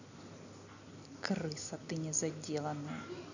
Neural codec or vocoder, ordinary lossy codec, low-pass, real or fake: none; none; 7.2 kHz; real